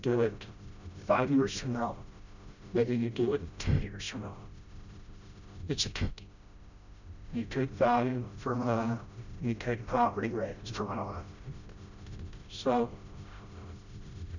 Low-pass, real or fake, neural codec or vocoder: 7.2 kHz; fake; codec, 16 kHz, 0.5 kbps, FreqCodec, smaller model